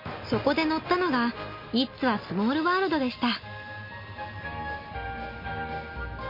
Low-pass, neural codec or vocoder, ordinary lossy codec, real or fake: 5.4 kHz; none; MP3, 32 kbps; real